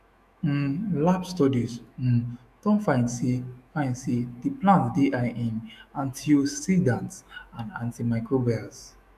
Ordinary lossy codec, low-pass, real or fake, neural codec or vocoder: none; 14.4 kHz; fake; autoencoder, 48 kHz, 128 numbers a frame, DAC-VAE, trained on Japanese speech